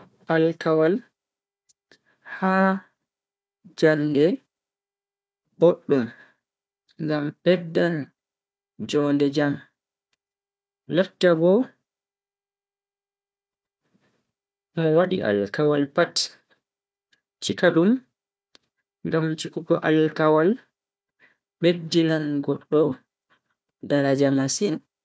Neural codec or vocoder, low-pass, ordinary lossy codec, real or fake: codec, 16 kHz, 1 kbps, FunCodec, trained on Chinese and English, 50 frames a second; none; none; fake